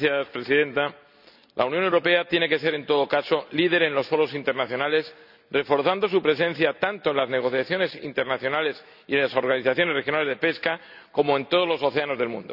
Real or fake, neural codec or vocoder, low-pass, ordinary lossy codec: real; none; 5.4 kHz; none